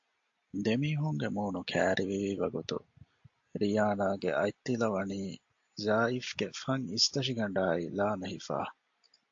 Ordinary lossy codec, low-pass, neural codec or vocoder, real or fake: AAC, 48 kbps; 7.2 kHz; none; real